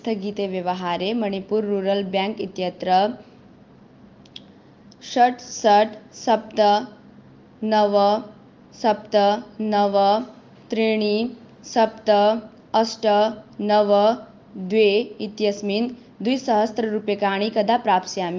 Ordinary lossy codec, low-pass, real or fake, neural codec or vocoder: Opus, 32 kbps; 7.2 kHz; real; none